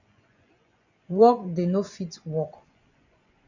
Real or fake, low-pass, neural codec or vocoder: fake; 7.2 kHz; vocoder, 24 kHz, 100 mel bands, Vocos